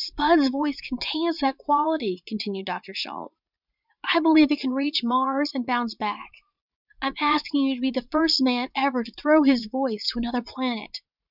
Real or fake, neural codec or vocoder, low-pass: fake; vocoder, 44.1 kHz, 80 mel bands, Vocos; 5.4 kHz